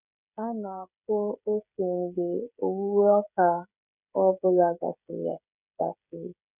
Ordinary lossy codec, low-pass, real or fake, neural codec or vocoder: none; 3.6 kHz; fake; codec, 16 kHz, 16 kbps, FreqCodec, smaller model